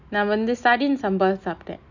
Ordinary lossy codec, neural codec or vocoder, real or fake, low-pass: none; none; real; 7.2 kHz